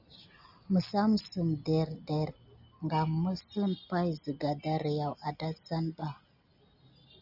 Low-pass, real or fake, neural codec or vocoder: 5.4 kHz; real; none